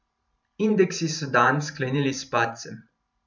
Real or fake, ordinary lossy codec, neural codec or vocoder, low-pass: fake; none; vocoder, 44.1 kHz, 128 mel bands every 512 samples, BigVGAN v2; 7.2 kHz